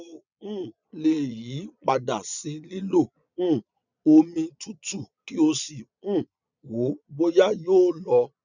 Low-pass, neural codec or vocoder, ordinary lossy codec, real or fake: 7.2 kHz; vocoder, 22.05 kHz, 80 mel bands, Vocos; none; fake